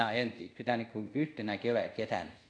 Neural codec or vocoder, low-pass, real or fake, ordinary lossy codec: codec, 24 kHz, 0.5 kbps, DualCodec; 9.9 kHz; fake; AAC, 48 kbps